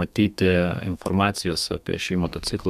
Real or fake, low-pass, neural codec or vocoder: fake; 14.4 kHz; codec, 32 kHz, 1.9 kbps, SNAC